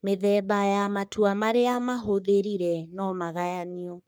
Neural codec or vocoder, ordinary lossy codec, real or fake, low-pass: codec, 44.1 kHz, 3.4 kbps, Pupu-Codec; none; fake; none